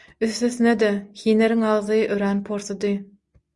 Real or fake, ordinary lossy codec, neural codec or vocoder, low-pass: real; Opus, 64 kbps; none; 10.8 kHz